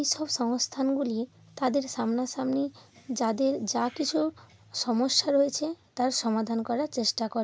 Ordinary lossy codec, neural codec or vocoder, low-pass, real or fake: none; none; none; real